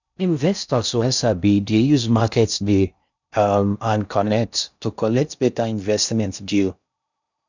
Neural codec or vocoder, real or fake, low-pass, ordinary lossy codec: codec, 16 kHz in and 24 kHz out, 0.6 kbps, FocalCodec, streaming, 4096 codes; fake; 7.2 kHz; none